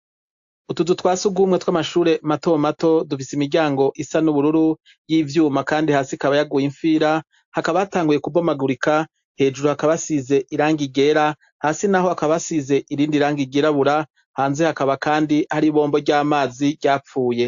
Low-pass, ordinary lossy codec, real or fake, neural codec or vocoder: 7.2 kHz; AAC, 64 kbps; real; none